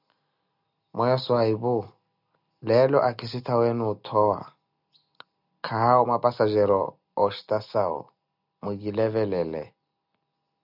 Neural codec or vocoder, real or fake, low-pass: none; real; 5.4 kHz